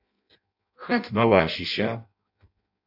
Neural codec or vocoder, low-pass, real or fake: codec, 16 kHz in and 24 kHz out, 0.6 kbps, FireRedTTS-2 codec; 5.4 kHz; fake